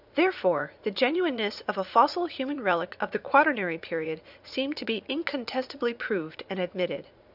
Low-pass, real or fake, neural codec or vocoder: 5.4 kHz; fake; vocoder, 44.1 kHz, 80 mel bands, Vocos